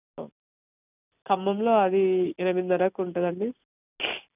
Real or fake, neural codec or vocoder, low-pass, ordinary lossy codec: real; none; 3.6 kHz; none